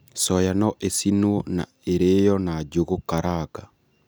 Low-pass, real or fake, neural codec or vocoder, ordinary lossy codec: none; real; none; none